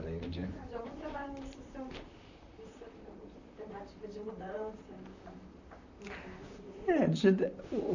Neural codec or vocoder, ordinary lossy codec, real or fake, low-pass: vocoder, 44.1 kHz, 128 mel bands, Pupu-Vocoder; none; fake; 7.2 kHz